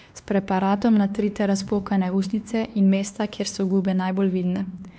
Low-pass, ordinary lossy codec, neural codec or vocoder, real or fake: none; none; codec, 16 kHz, 2 kbps, X-Codec, WavLM features, trained on Multilingual LibriSpeech; fake